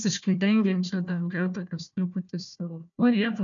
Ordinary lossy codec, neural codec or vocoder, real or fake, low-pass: MP3, 96 kbps; codec, 16 kHz, 1 kbps, FunCodec, trained on Chinese and English, 50 frames a second; fake; 7.2 kHz